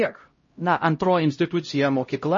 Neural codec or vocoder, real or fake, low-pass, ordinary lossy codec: codec, 16 kHz, 0.5 kbps, X-Codec, HuBERT features, trained on LibriSpeech; fake; 7.2 kHz; MP3, 32 kbps